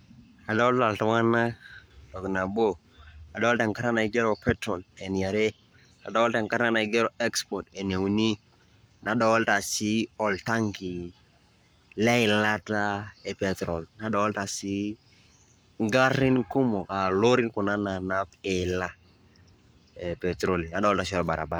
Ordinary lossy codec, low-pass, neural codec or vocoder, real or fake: none; none; codec, 44.1 kHz, 7.8 kbps, Pupu-Codec; fake